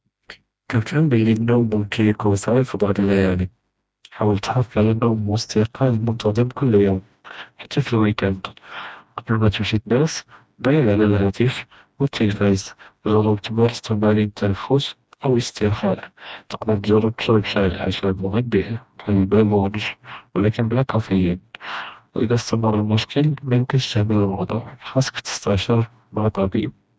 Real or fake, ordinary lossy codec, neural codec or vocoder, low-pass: fake; none; codec, 16 kHz, 1 kbps, FreqCodec, smaller model; none